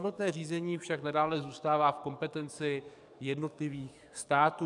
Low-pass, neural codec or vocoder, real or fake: 10.8 kHz; codec, 44.1 kHz, 7.8 kbps, DAC; fake